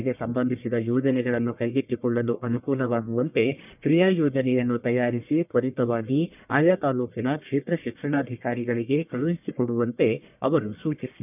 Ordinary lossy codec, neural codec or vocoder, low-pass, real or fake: none; codec, 44.1 kHz, 1.7 kbps, Pupu-Codec; 3.6 kHz; fake